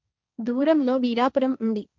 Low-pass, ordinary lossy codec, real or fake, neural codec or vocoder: none; none; fake; codec, 16 kHz, 1.1 kbps, Voila-Tokenizer